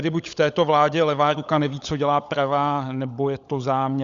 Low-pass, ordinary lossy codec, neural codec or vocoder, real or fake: 7.2 kHz; Opus, 64 kbps; codec, 16 kHz, 16 kbps, FunCodec, trained on LibriTTS, 50 frames a second; fake